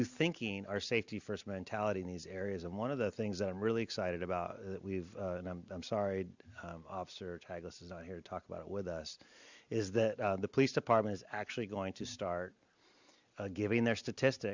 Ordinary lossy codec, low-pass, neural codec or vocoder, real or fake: Opus, 64 kbps; 7.2 kHz; none; real